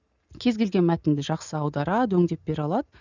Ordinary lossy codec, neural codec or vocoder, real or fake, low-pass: none; none; real; 7.2 kHz